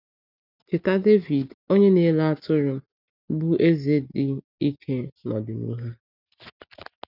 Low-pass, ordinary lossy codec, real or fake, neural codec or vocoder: 5.4 kHz; none; real; none